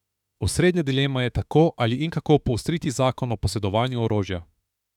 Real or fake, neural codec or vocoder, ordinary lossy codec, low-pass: fake; autoencoder, 48 kHz, 32 numbers a frame, DAC-VAE, trained on Japanese speech; none; 19.8 kHz